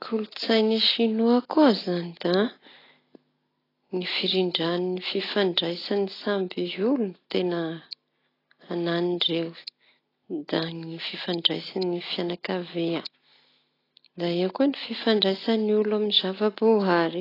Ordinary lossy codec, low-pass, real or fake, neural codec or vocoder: AAC, 24 kbps; 5.4 kHz; real; none